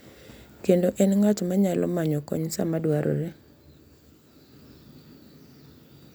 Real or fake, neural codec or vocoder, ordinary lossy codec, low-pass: real; none; none; none